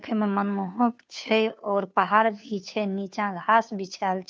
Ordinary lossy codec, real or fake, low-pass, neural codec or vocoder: none; fake; none; codec, 16 kHz, 2 kbps, FunCodec, trained on Chinese and English, 25 frames a second